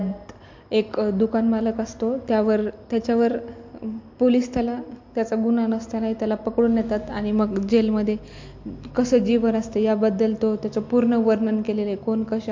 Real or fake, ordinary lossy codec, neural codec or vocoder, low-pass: real; MP3, 48 kbps; none; 7.2 kHz